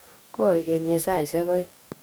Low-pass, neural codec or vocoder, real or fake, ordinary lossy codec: none; codec, 44.1 kHz, 2.6 kbps, DAC; fake; none